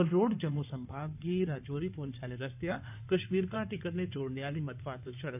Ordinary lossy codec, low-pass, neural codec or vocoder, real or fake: none; 3.6 kHz; codec, 16 kHz in and 24 kHz out, 2.2 kbps, FireRedTTS-2 codec; fake